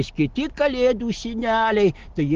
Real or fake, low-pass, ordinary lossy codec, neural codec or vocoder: real; 7.2 kHz; Opus, 16 kbps; none